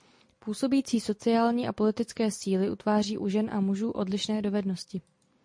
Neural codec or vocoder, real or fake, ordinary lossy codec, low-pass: none; real; MP3, 48 kbps; 9.9 kHz